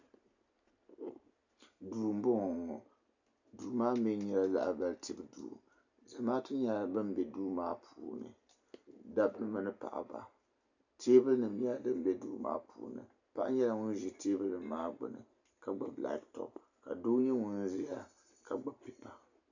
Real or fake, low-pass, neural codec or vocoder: real; 7.2 kHz; none